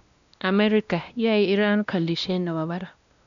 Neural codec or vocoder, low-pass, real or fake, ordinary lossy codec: codec, 16 kHz, 1 kbps, X-Codec, WavLM features, trained on Multilingual LibriSpeech; 7.2 kHz; fake; none